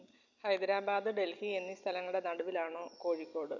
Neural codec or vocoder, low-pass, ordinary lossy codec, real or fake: none; 7.2 kHz; none; real